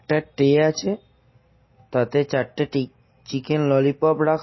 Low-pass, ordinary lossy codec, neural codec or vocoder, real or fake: 7.2 kHz; MP3, 24 kbps; none; real